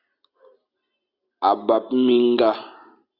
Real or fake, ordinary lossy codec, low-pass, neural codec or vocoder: real; Opus, 64 kbps; 5.4 kHz; none